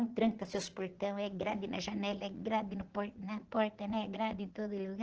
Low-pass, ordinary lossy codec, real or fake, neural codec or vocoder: 7.2 kHz; Opus, 16 kbps; fake; vocoder, 44.1 kHz, 80 mel bands, Vocos